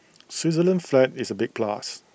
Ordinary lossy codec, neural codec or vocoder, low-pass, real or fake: none; none; none; real